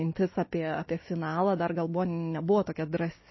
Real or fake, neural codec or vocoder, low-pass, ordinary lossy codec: real; none; 7.2 kHz; MP3, 24 kbps